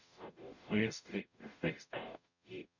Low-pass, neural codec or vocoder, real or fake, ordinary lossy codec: 7.2 kHz; codec, 44.1 kHz, 0.9 kbps, DAC; fake; none